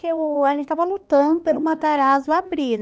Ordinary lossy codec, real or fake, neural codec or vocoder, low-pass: none; fake; codec, 16 kHz, 2 kbps, X-Codec, HuBERT features, trained on LibriSpeech; none